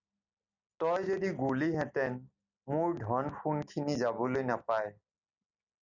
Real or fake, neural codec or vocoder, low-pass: real; none; 7.2 kHz